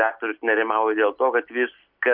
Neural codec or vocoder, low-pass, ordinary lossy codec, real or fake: none; 5.4 kHz; MP3, 48 kbps; real